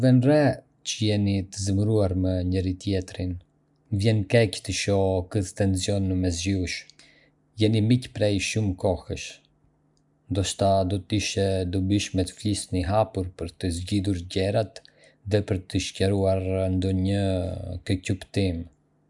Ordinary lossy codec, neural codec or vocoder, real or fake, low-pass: none; none; real; 10.8 kHz